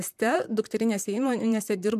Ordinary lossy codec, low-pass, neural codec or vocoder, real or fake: MP3, 96 kbps; 14.4 kHz; vocoder, 44.1 kHz, 128 mel bands, Pupu-Vocoder; fake